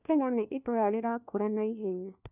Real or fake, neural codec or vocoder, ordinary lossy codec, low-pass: fake; codec, 16 kHz, 1 kbps, FunCodec, trained on LibriTTS, 50 frames a second; none; 3.6 kHz